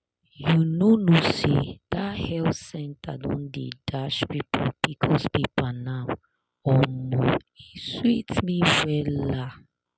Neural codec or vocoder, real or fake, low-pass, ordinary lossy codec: none; real; none; none